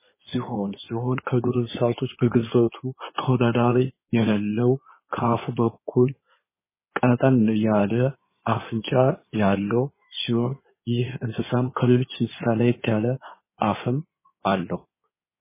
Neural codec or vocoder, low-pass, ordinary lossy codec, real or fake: codec, 16 kHz in and 24 kHz out, 2.2 kbps, FireRedTTS-2 codec; 3.6 kHz; MP3, 16 kbps; fake